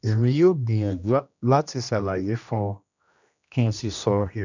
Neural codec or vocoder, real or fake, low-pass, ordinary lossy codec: codec, 16 kHz, 1 kbps, X-Codec, HuBERT features, trained on general audio; fake; 7.2 kHz; none